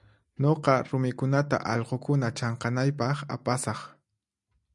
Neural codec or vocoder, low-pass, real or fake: none; 10.8 kHz; real